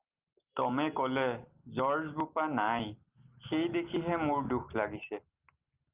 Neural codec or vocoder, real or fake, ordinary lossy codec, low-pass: none; real; Opus, 24 kbps; 3.6 kHz